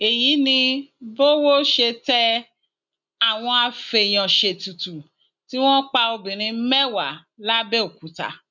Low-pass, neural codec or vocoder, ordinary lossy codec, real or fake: 7.2 kHz; none; none; real